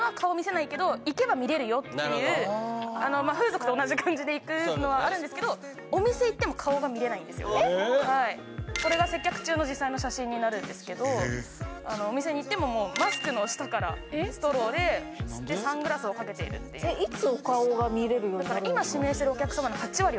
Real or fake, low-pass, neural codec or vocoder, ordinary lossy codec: real; none; none; none